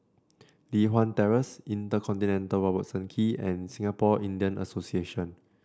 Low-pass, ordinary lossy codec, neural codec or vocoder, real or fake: none; none; none; real